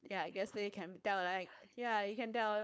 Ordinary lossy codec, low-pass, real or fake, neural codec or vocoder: none; none; fake; codec, 16 kHz, 4.8 kbps, FACodec